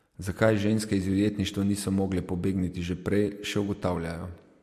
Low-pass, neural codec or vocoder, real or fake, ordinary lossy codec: 14.4 kHz; none; real; MP3, 64 kbps